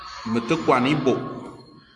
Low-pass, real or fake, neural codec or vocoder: 10.8 kHz; real; none